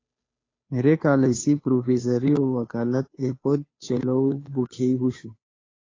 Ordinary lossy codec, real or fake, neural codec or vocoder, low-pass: AAC, 32 kbps; fake; codec, 16 kHz, 2 kbps, FunCodec, trained on Chinese and English, 25 frames a second; 7.2 kHz